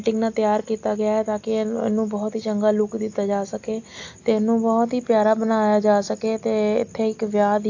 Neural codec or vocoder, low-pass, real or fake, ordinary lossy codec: none; 7.2 kHz; real; AAC, 48 kbps